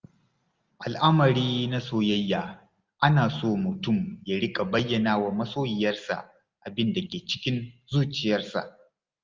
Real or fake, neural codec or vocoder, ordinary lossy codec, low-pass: real; none; Opus, 24 kbps; 7.2 kHz